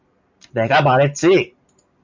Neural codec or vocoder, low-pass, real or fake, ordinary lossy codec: none; 7.2 kHz; real; MP3, 64 kbps